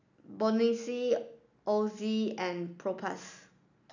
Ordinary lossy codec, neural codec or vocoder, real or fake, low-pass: none; none; real; 7.2 kHz